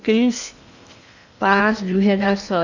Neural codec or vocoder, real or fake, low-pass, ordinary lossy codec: codec, 16 kHz in and 24 kHz out, 0.8 kbps, FocalCodec, streaming, 65536 codes; fake; 7.2 kHz; none